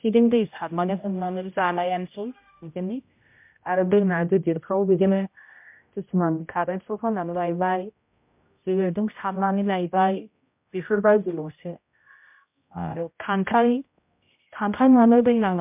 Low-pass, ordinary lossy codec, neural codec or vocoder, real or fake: 3.6 kHz; MP3, 32 kbps; codec, 16 kHz, 0.5 kbps, X-Codec, HuBERT features, trained on general audio; fake